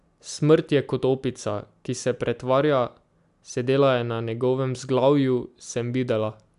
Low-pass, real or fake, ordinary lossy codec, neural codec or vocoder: 10.8 kHz; real; AAC, 96 kbps; none